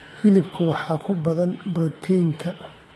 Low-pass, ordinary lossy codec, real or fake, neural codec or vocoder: 19.8 kHz; AAC, 32 kbps; fake; autoencoder, 48 kHz, 32 numbers a frame, DAC-VAE, trained on Japanese speech